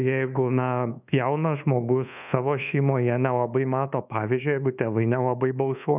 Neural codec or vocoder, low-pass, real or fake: codec, 24 kHz, 1.2 kbps, DualCodec; 3.6 kHz; fake